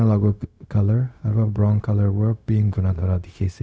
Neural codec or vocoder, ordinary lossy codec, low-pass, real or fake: codec, 16 kHz, 0.4 kbps, LongCat-Audio-Codec; none; none; fake